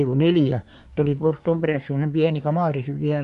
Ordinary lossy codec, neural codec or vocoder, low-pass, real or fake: none; codec, 24 kHz, 1 kbps, SNAC; 10.8 kHz; fake